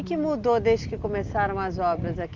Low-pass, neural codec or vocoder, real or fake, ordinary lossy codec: 7.2 kHz; none; real; Opus, 32 kbps